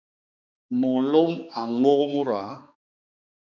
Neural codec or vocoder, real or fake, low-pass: codec, 16 kHz, 2 kbps, X-Codec, HuBERT features, trained on balanced general audio; fake; 7.2 kHz